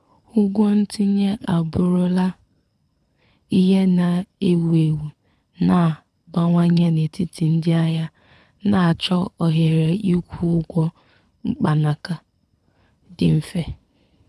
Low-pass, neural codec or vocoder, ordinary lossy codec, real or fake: none; codec, 24 kHz, 6 kbps, HILCodec; none; fake